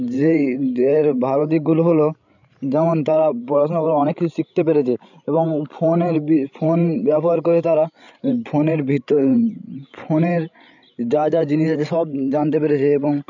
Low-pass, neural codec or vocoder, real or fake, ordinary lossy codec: 7.2 kHz; codec, 16 kHz, 16 kbps, FreqCodec, larger model; fake; none